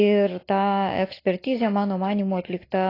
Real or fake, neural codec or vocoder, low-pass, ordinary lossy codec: real; none; 5.4 kHz; AAC, 24 kbps